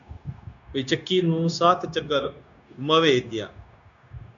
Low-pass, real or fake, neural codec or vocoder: 7.2 kHz; fake; codec, 16 kHz, 0.9 kbps, LongCat-Audio-Codec